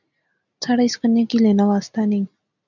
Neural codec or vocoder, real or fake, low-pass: none; real; 7.2 kHz